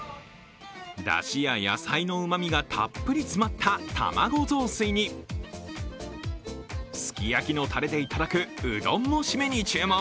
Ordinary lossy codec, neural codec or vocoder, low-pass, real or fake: none; none; none; real